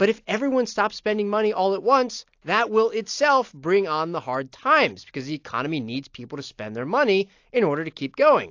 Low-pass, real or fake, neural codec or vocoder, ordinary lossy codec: 7.2 kHz; real; none; AAC, 48 kbps